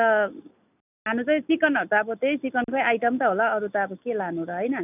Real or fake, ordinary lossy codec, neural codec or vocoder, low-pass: real; none; none; 3.6 kHz